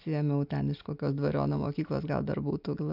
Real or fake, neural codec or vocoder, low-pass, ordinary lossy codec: real; none; 5.4 kHz; AAC, 48 kbps